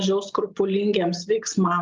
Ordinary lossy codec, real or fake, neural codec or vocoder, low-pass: Opus, 24 kbps; real; none; 7.2 kHz